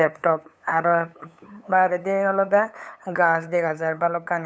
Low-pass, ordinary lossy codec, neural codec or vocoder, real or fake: none; none; codec, 16 kHz, 8 kbps, FunCodec, trained on LibriTTS, 25 frames a second; fake